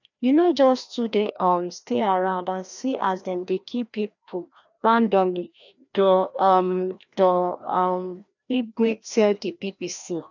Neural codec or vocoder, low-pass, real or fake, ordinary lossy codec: codec, 16 kHz, 1 kbps, FreqCodec, larger model; 7.2 kHz; fake; none